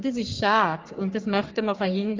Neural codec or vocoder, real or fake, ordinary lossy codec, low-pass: codec, 44.1 kHz, 3.4 kbps, Pupu-Codec; fake; Opus, 24 kbps; 7.2 kHz